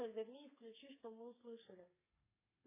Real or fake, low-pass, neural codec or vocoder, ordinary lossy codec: fake; 3.6 kHz; codec, 32 kHz, 1.9 kbps, SNAC; MP3, 16 kbps